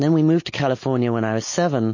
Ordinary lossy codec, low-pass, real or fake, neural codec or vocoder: MP3, 32 kbps; 7.2 kHz; real; none